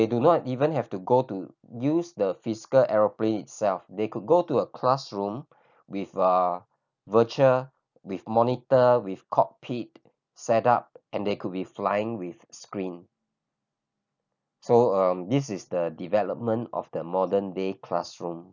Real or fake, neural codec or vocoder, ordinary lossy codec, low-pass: real; none; none; 7.2 kHz